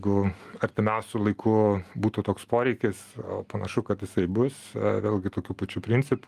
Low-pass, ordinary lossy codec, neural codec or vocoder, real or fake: 14.4 kHz; Opus, 32 kbps; none; real